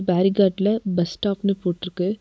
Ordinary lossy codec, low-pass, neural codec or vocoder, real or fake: none; none; none; real